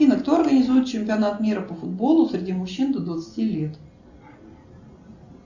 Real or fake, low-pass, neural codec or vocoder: real; 7.2 kHz; none